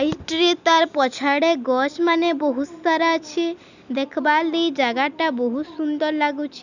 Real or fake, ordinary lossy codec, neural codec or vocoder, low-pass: real; none; none; 7.2 kHz